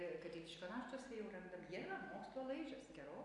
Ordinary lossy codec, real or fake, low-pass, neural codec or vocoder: AAC, 64 kbps; real; 10.8 kHz; none